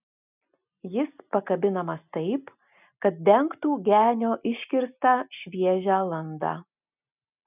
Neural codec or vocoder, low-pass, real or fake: none; 3.6 kHz; real